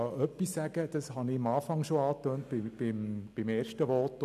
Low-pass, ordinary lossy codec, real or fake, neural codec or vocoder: 14.4 kHz; none; real; none